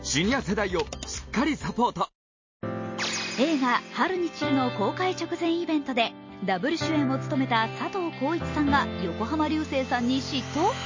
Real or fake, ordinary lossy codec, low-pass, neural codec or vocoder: real; MP3, 32 kbps; 7.2 kHz; none